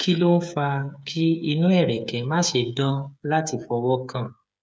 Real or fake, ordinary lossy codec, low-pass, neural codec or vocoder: fake; none; none; codec, 16 kHz, 8 kbps, FreqCodec, smaller model